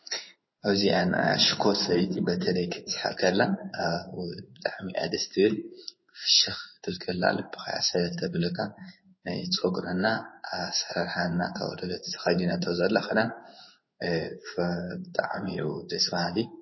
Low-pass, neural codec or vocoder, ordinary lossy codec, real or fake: 7.2 kHz; codec, 16 kHz in and 24 kHz out, 1 kbps, XY-Tokenizer; MP3, 24 kbps; fake